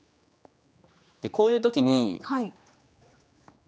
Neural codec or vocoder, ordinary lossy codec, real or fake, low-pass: codec, 16 kHz, 2 kbps, X-Codec, HuBERT features, trained on general audio; none; fake; none